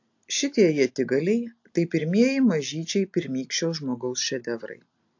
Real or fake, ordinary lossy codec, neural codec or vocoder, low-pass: real; AAC, 48 kbps; none; 7.2 kHz